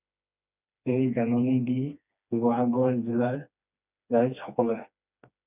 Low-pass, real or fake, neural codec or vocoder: 3.6 kHz; fake; codec, 16 kHz, 2 kbps, FreqCodec, smaller model